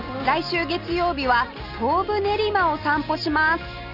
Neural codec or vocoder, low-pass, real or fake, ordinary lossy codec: none; 5.4 kHz; real; none